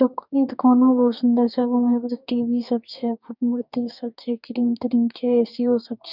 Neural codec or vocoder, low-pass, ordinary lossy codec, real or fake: codec, 44.1 kHz, 2.6 kbps, DAC; 5.4 kHz; none; fake